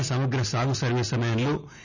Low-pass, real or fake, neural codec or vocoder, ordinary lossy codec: 7.2 kHz; real; none; none